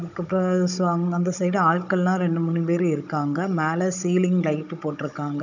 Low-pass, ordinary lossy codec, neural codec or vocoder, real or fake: 7.2 kHz; none; codec, 16 kHz, 16 kbps, FunCodec, trained on Chinese and English, 50 frames a second; fake